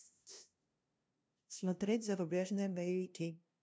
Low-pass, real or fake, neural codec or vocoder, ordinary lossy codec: none; fake; codec, 16 kHz, 0.5 kbps, FunCodec, trained on LibriTTS, 25 frames a second; none